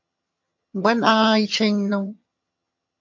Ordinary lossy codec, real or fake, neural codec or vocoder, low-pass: MP3, 48 kbps; fake; vocoder, 22.05 kHz, 80 mel bands, HiFi-GAN; 7.2 kHz